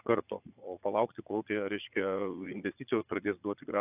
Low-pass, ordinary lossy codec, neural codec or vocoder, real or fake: 3.6 kHz; AAC, 32 kbps; vocoder, 22.05 kHz, 80 mel bands, Vocos; fake